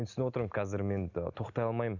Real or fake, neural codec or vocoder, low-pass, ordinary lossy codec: real; none; 7.2 kHz; none